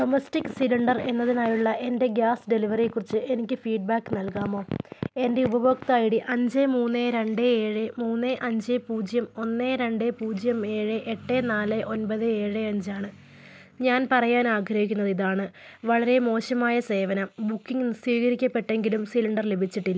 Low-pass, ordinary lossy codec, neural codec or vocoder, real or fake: none; none; none; real